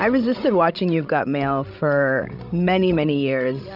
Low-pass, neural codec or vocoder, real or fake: 5.4 kHz; codec, 16 kHz, 16 kbps, FreqCodec, larger model; fake